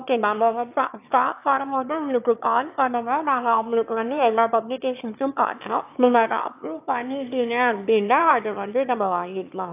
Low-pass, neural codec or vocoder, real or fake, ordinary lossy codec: 3.6 kHz; autoencoder, 22.05 kHz, a latent of 192 numbers a frame, VITS, trained on one speaker; fake; AAC, 32 kbps